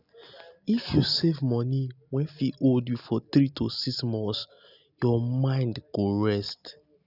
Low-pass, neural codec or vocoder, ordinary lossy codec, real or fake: 5.4 kHz; none; none; real